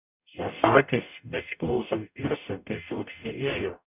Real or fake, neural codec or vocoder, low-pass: fake; codec, 44.1 kHz, 0.9 kbps, DAC; 3.6 kHz